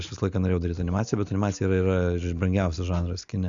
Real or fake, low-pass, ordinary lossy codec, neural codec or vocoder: real; 7.2 kHz; Opus, 64 kbps; none